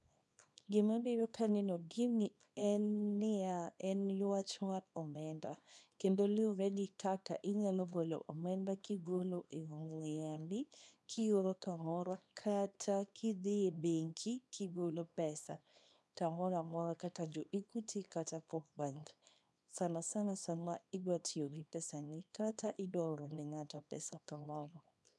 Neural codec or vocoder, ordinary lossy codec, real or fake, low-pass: codec, 24 kHz, 0.9 kbps, WavTokenizer, small release; none; fake; none